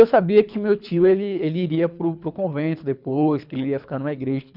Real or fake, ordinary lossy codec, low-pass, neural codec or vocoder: fake; none; 5.4 kHz; codec, 24 kHz, 3 kbps, HILCodec